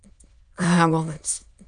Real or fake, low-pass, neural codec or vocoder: fake; 9.9 kHz; autoencoder, 22.05 kHz, a latent of 192 numbers a frame, VITS, trained on many speakers